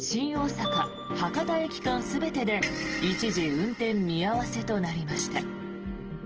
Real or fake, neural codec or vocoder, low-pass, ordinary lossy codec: real; none; 7.2 kHz; Opus, 16 kbps